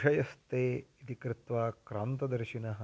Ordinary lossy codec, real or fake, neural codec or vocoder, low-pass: none; real; none; none